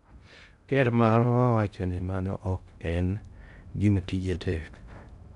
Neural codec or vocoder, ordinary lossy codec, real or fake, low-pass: codec, 16 kHz in and 24 kHz out, 0.6 kbps, FocalCodec, streaming, 2048 codes; none; fake; 10.8 kHz